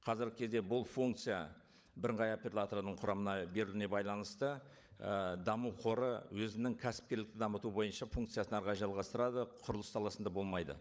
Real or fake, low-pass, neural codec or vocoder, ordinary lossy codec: fake; none; codec, 16 kHz, 16 kbps, FunCodec, trained on LibriTTS, 50 frames a second; none